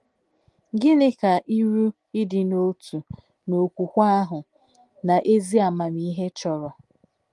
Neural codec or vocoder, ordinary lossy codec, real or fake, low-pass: none; Opus, 24 kbps; real; 10.8 kHz